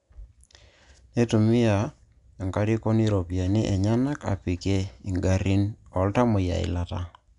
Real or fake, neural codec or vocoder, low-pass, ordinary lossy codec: real; none; 10.8 kHz; none